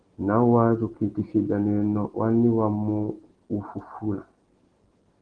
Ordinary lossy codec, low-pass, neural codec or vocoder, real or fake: Opus, 16 kbps; 9.9 kHz; none; real